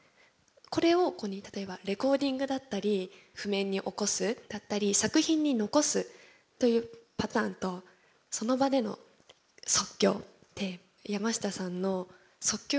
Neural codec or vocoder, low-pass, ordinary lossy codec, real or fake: none; none; none; real